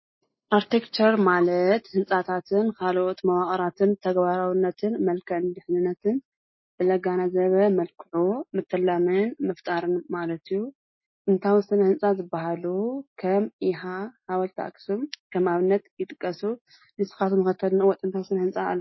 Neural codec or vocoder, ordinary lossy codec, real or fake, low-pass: none; MP3, 24 kbps; real; 7.2 kHz